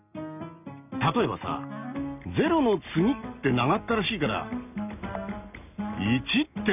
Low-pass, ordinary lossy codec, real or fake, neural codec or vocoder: 3.6 kHz; none; real; none